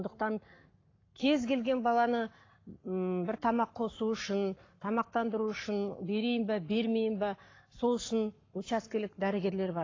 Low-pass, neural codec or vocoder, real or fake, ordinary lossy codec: 7.2 kHz; codec, 44.1 kHz, 7.8 kbps, Pupu-Codec; fake; AAC, 32 kbps